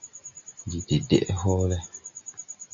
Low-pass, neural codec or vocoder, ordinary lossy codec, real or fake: 7.2 kHz; none; AAC, 64 kbps; real